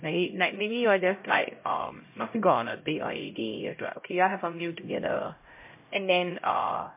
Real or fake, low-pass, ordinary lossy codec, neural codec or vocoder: fake; 3.6 kHz; MP3, 24 kbps; codec, 16 kHz, 0.5 kbps, X-Codec, HuBERT features, trained on LibriSpeech